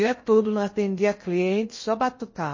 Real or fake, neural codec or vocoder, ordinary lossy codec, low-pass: fake; codec, 16 kHz in and 24 kHz out, 0.6 kbps, FocalCodec, streaming, 2048 codes; MP3, 32 kbps; 7.2 kHz